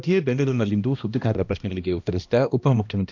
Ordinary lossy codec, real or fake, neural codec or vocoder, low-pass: none; fake; codec, 16 kHz, 1 kbps, X-Codec, HuBERT features, trained on balanced general audio; 7.2 kHz